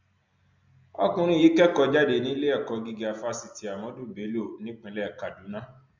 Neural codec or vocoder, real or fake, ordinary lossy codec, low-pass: none; real; MP3, 64 kbps; 7.2 kHz